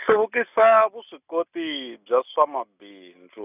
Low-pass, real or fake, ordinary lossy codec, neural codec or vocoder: 3.6 kHz; real; none; none